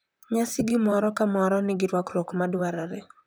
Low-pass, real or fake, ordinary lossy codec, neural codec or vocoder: none; fake; none; vocoder, 44.1 kHz, 128 mel bands, Pupu-Vocoder